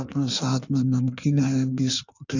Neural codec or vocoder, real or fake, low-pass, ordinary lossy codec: codec, 16 kHz in and 24 kHz out, 1.1 kbps, FireRedTTS-2 codec; fake; 7.2 kHz; none